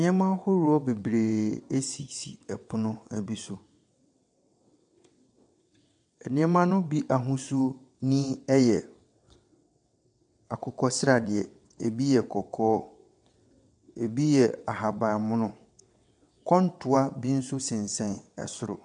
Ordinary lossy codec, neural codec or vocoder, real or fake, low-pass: MP3, 64 kbps; vocoder, 22.05 kHz, 80 mel bands, WaveNeXt; fake; 9.9 kHz